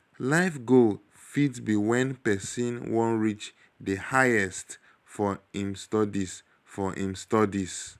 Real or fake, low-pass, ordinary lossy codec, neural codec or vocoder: real; 14.4 kHz; none; none